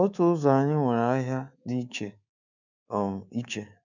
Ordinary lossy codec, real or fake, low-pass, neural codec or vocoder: none; fake; 7.2 kHz; codec, 24 kHz, 3.1 kbps, DualCodec